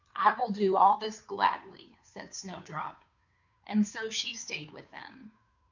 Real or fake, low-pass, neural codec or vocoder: fake; 7.2 kHz; codec, 16 kHz, 2 kbps, FunCodec, trained on Chinese and English, 25 frames a second